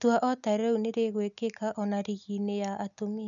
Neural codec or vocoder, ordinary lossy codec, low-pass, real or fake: none; none; 7.2 kHz; real